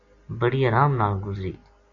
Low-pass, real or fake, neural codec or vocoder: 7.2 kHz; real; none